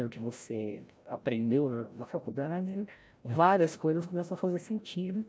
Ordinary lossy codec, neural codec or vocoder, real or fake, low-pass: none; codec, 16 kHz, 0.5 kbps, FreqCodec, larger model; fake; none